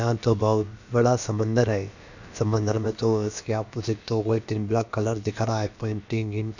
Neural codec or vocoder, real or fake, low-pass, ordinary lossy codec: codec, 16 kHz, about 1 kbps, DyCAST, with the encoder's durations; fake; 7.2 kHz; none